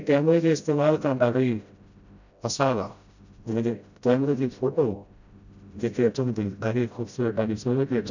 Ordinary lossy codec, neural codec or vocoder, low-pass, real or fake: none; codec, 16 kHz, 0.5 kbps, FreqCodec, smaller model; 7.2 kHz; fake